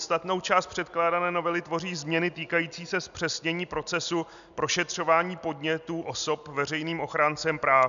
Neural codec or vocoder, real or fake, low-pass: none; real; 7.2 kHz